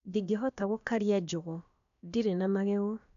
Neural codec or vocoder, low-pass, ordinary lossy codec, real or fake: codec, 16 kHz, about 1 kbps, DyCAST, with the encoder's durations; 7.2 kHz; none; fake